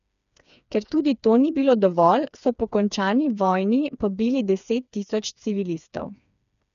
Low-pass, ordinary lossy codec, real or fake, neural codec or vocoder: 7.2 kHz; none; fake; codec, 16 kHz, 4 kbps, FreqCodec, smaller model